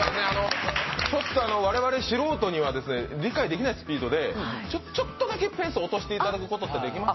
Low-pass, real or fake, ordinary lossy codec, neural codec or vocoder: 7.2 kHz; real; MP3, 24 kbps; none